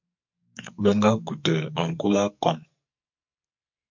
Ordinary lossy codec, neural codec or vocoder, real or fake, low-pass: MP3, 48 kbps; codec, 44.1 kHz, 2.6 kbps, SNAC; fake; 7.2 kHz